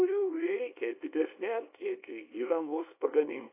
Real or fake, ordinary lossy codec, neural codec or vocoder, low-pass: fake; AAC, 24 kbps; codec, 24 kHz, 0.9 kbps, WavTokenizer, small release; 3.6 kHz